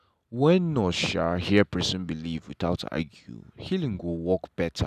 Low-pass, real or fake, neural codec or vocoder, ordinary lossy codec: 14.4 kHz; real; none; none